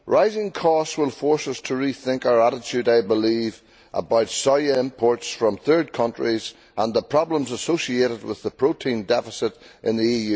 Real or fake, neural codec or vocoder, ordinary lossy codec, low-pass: real; none; none; none